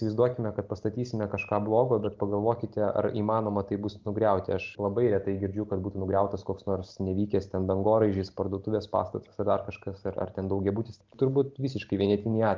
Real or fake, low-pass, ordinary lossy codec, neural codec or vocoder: real; 7.2 kHz; Opus, 32 kbps; none